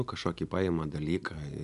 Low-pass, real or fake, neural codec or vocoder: 10.8 kHz; real; none